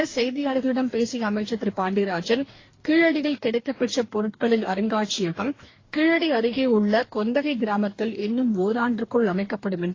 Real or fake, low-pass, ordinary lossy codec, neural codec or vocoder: fake; 7.2 kHz; AAC, 32 kbps; codec, 44.1 kHz, 2.6 kbps, DAC